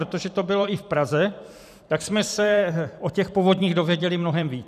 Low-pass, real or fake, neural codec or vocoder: 14.4 kHz; fake; vocoder, 44.1 kHz, 128 mel bands every 512 samples, BigVGAN v2